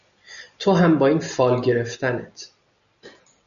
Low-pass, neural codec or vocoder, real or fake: 7.2 kHz; none; real